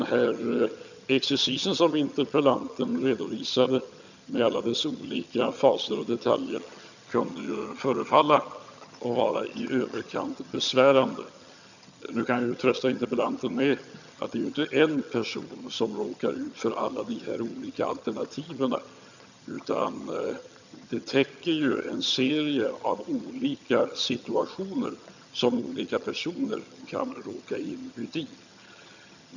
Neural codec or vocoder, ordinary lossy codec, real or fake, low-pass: vocoder, 22.05 kHz, 80 mel bands, HiFi-GAN; none; fake; 7.2 kHz